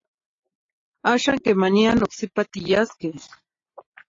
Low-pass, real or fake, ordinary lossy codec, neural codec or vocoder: 7.2 kHz; real; AAC, 32 kbps; none